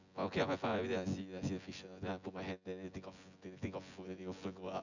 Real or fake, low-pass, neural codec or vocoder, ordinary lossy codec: fake; 7.2 kHz; vocoder, 24 kHz, 100 mel bands, Vocos; none